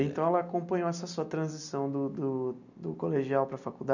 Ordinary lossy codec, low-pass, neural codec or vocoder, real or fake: none; 7.2 kHz; none; real